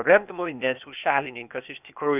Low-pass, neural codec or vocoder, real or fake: 3.6 kHz; codec, 16 kHz, 0.8 kbps, ZipCodec; fake